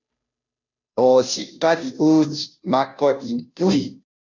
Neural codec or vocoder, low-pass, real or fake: codec, 16 kHz, 0.5 kbps, FunCodec, trained on Chinese and English, 25 frames a second; 7.2 kHz; fake